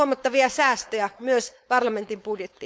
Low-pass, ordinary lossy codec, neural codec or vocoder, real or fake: none; none; codec, 16 kHz, 4.8 kbps, FACodec; fake